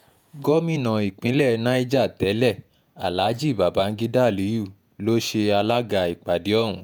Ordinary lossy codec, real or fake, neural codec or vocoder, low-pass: none; fake; vocoder, 48 kHz, 128 mel bands, Vocos; none